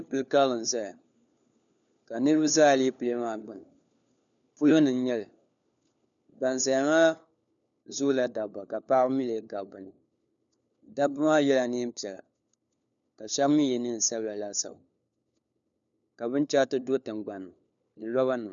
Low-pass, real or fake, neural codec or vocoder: 7.2 kHz; fake; codec, 16 kHz, 4 kbps, FunCodec, trained on LibriTTS, 50 frames a second